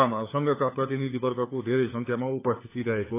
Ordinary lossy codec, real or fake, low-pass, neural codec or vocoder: AAC, 24 kbps; fake; 3.6 kHz; codec, 16 kHz, 4 kbps, FunCodec, trained on LibriTTS, 50 frames a second